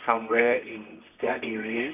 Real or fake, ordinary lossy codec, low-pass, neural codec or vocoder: fake; none; 3.6 kHz; codec, 24 kHz, 0.9 kbps, WavTokenizer, medium music audio release